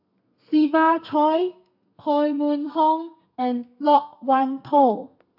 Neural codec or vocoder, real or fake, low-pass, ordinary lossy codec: codec, 32 kHz, 1.9 kbps, SNAC; fake; 5.4 kHz; AAC, 48 kbps